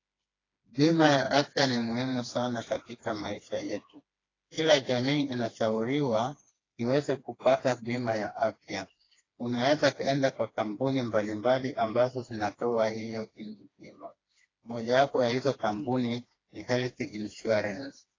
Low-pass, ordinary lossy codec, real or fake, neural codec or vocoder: 7.2 kHz; AAC, 32 kbps; fake; codec, 16 kHz, 2 kbps, FreqCodec, smaller model